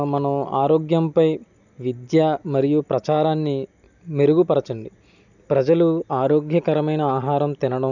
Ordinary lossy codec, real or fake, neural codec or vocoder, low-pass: none; real; none; 7.2 kHz